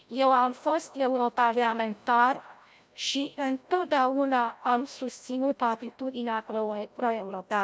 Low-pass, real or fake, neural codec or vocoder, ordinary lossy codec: none; fake; codec, 16 kHz, 0.5 kbps, FreqCodec, larger model; none